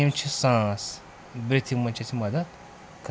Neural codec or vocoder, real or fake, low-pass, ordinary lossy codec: none; real; none; none